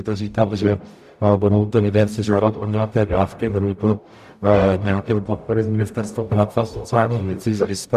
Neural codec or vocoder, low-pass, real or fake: codec, 44.1 kHz, 0.9 kbps, DAC; 14.4 kHz; fake